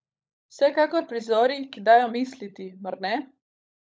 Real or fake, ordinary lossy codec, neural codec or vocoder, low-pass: fake; none; codec, 16 kHz, 16 kbps, FunCodec, trained on LibriTTS, 50 frames a second; none